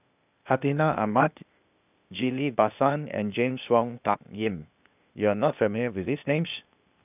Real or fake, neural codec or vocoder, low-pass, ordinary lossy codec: fake; codec, 16 kHz, 0.8 kbps, ZipCodec; 3.6 kHz; none